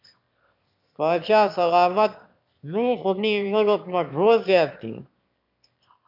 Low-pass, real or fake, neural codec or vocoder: 5.4 kHz; fake; autoencoder, 22.05 kHz, a latent of 192 numbers a frame, VITS, trained on one speaker